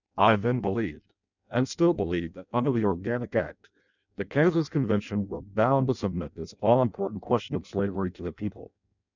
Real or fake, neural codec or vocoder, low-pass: fake; codec, 16 kHz in and 24 kHz out, 0.6 kbps, FireRedTTS-2 codec; 7.2 kHz